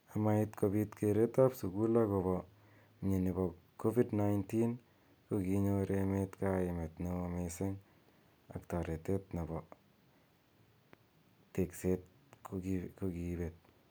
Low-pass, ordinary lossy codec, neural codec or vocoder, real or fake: none; none; none; real